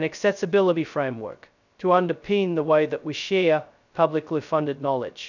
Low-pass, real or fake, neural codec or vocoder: 7.2 kHz; fake; codec, 16 kHz, 0.2 kbps, FocalCodec